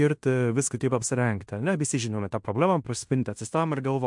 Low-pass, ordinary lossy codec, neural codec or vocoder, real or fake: 10.8 kHz; MP3, 64 kbps; codec, 16 kHz in and 24 kHz out, 0.9 kbps, LongCat-Audio-Codec, fine tuned four codebook decoder; fake